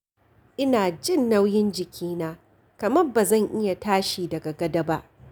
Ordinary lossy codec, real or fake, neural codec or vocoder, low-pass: none; real; none; none